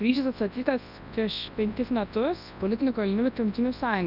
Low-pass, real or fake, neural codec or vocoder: 5.4 kHz; fake; codec, 24 kHz, 0.9 kbps, WavTokenizer, large speech release